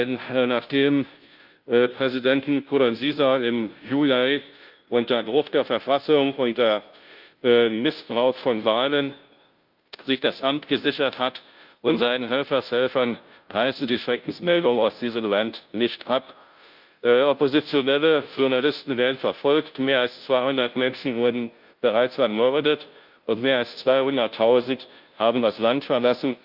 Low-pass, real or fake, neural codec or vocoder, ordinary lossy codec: 5.4 kHz; fake; codec, 16 kHz, 0.5 kbps, FunCodec, trained on Chinese and English, 25 frames a second; Opus, 24 kbps